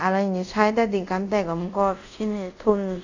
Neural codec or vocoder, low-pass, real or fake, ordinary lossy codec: codec, 24 kHz, 0.5 kbps, DualCodec; 7.2 kHz; fake; none